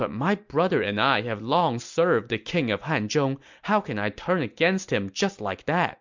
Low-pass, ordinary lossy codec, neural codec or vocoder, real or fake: 7.2 kHz; MP3, 64 kbps; none; real